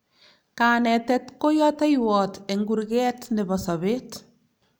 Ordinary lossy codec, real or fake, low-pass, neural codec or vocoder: none; real; none; none